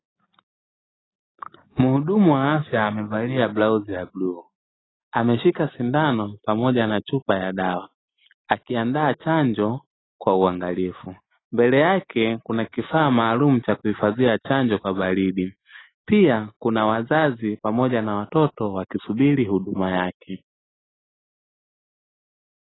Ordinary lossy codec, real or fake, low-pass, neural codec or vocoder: AAC, 16 kbps; real; 7.2 kHz; none